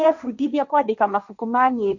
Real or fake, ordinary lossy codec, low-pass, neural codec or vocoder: fake; none; 7.2 kHz; codec, 16 kHz, 1.1 kbps, Voila-Tokenizer